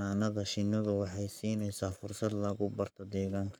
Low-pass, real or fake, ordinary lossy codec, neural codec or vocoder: none; fake; none; codec, 44.1 kHz, 7.8 kbps, Pupu-Codec